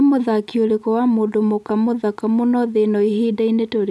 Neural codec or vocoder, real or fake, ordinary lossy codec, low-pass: none; real; none; none